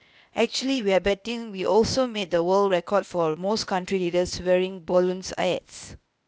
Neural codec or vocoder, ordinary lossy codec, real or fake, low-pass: codec, 16 kHz, 0.8 kbps, ZipCodec; none; fake; none